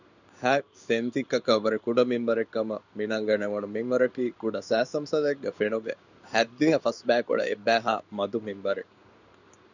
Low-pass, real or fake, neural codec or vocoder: 7.2 kHz; fake; codec, 16 kHz in and 24 kHz out, 1 kbps, XY-Tokenizer